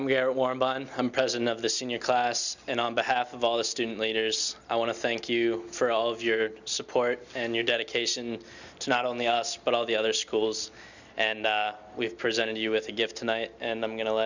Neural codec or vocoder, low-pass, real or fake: none; 7.2 kHz; real